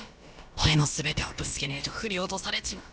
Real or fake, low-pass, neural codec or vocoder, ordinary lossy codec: fake; none; codec, 16 kHz, about 1 kbps, DyCAST, with the encoder's durations; none